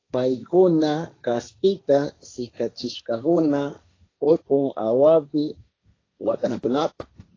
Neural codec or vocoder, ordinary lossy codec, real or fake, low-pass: codec, 16 kHz, 1.1 kbps, Voila-Tokenizer; AAC, 32 kbps; fake; 7.2 kHz